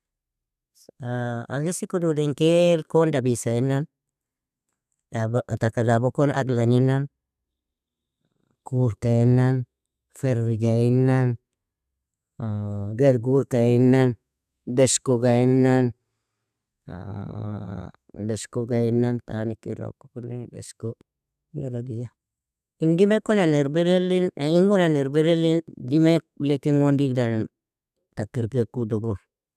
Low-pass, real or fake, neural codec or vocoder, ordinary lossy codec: 14.4 kHz; fake; codec, 32 kHz, 1.9 kbps, SNAC; none